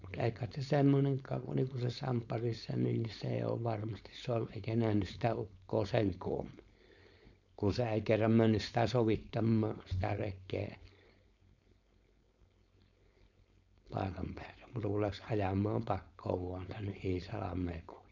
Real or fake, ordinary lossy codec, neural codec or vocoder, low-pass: fake; none; codec, 16 kHz, 4.8 kbps, FACodec; 7.2 kHz